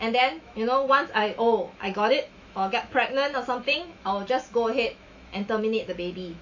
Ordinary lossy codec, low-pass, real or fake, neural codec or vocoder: AAC, 48 kbps; 7.2 kHz; fake; autoencoder, 48 kHz, 128 numbers a frame, DAC-VAE, trained on Japanese speech